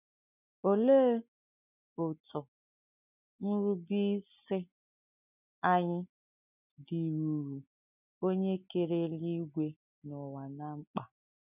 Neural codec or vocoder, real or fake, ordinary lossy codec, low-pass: none; real; none; 3.6 kHz